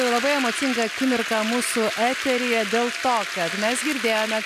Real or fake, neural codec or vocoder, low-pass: real; none; 14.4 kHz